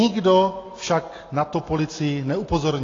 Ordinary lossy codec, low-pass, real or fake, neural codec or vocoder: AAC, 32 kbps; 7.2 kHz; real; none